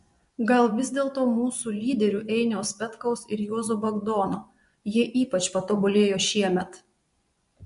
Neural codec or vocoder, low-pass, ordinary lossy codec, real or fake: vocoder, 24 kHz, 100 mel bands, Vocos; 10.8 kHz; MP3, 64 kbps; fake